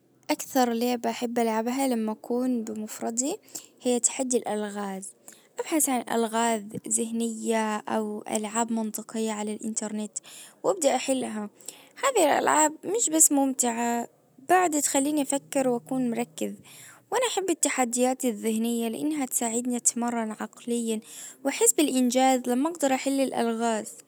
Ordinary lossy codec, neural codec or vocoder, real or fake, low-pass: none; none; real; none